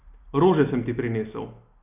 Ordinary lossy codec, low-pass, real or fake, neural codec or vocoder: none; 3.6 kHz; real; none